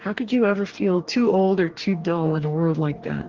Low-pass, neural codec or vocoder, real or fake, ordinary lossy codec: 7.2 kHz; codec, 44.1 kHz, 2.6 kbps, DAC; fake; Opus, 16 kbps